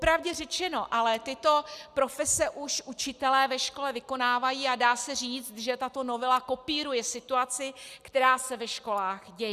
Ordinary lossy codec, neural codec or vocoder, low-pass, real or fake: Opus, 64 kbps; none; 14.4 kHz; real